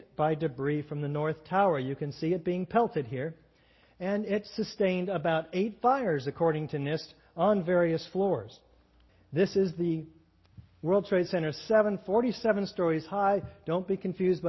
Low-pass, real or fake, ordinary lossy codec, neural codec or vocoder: 7.2 kHz; real; MP3, 24 kbps; none